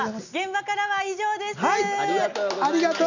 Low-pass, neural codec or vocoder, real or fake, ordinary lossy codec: 7.2 kHz; none; real; none